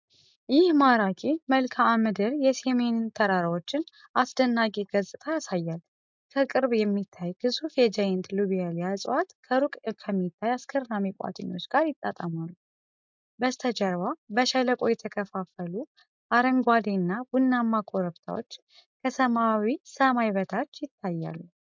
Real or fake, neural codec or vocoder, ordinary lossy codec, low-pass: real; none; MP3, 64 kbps; 7.2 kHz